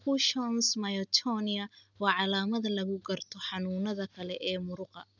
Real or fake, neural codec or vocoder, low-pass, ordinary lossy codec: real; none; 7.2 kHz; none